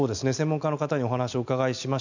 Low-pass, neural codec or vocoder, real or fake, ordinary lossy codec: 7.2 kHz; none; real; none